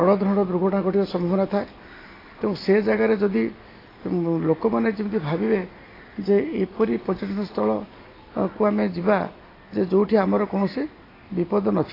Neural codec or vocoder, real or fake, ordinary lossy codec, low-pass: none; real; AAC, 24 kbps; 5.4 kHz